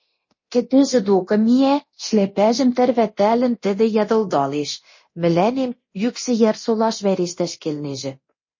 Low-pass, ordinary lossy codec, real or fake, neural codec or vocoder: 7.2 kHz; MP3, 32 kbps; fake; codec, 24 kHz, 0.9 kbps, DualCodec